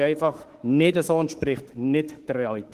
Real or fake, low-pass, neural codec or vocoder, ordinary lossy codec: fake; 14.4 kHz; autoencoder, 48 kHz, 32 numbers a frame, DAC-VAE, trained on Japanese speech; Opus, 16 kbps